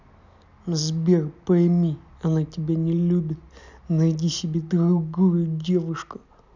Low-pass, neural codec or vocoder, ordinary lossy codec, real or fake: 7.2 kHz; none; none; real